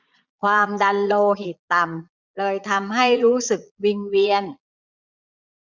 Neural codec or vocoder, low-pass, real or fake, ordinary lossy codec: vocoder, 22.05 kHz, 80 mel bands, Vocos; 7.2 kHz; fake; none